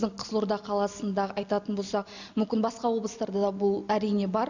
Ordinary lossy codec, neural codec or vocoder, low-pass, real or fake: none; none; 7.2 kHz; real